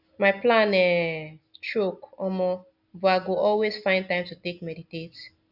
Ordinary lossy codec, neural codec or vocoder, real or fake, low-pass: none; none; real; 5.4 kHz